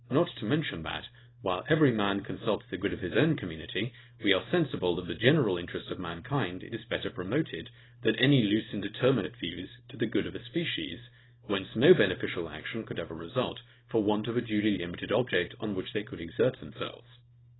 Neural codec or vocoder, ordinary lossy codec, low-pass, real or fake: codec, 24 kHz, 0.9 kbps, WavTokenizer, small release; AAC, 16 kbps; 7.2 kHz; fake